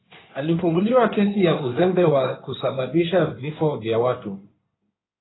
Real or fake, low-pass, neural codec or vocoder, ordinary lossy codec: fake; 7.2 kHz; codec, 16 kHz in and 24 kHz out, 2.2 kbps, FireRedTTS-2 codec; AAC, 16 kbps